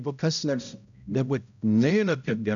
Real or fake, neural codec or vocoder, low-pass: fake; codec, 16 kHz, 0.5 kbps, X-Codec, HuBERT features, trained on balanced general audio; 7.2 kHz